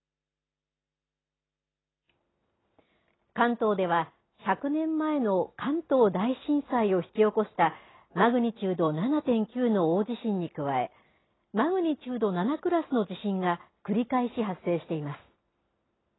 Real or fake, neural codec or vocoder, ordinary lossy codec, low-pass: real; none; AAC, 16 kbps; 7.2 kHz